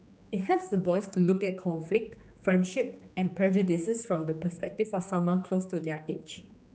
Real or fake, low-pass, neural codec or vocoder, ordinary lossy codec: fake; none; codec, 16 kHz, 2 kbps, X-Codec, HuBERT features, trained on general audio; none